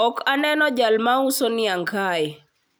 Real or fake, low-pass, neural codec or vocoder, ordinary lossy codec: real; none; none; none